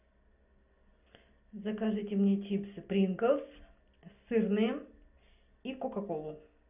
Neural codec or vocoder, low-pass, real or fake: none; 3.6 kHz; real